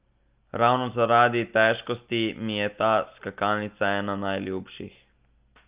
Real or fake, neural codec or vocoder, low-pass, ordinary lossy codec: real; none; 3.6 kHz; Opus, 24 kbps